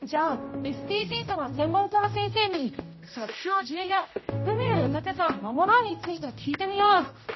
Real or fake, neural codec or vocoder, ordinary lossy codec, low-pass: fake; codec, 16 kHz, 0.5 kbps, X-Codec, HuBERT features, trained on general audio; MP3, 24 kbps; 7.2 kHz